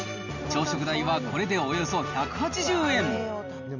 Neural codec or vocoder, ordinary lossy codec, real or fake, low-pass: none; none; real; 7.2 kHz